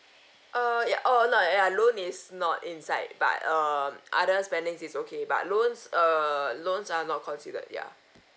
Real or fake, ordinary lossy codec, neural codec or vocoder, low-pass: real; none; none; none